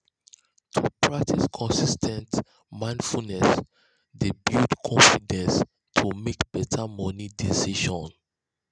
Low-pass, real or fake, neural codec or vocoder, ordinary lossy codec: 9.9 kHz; real; none; none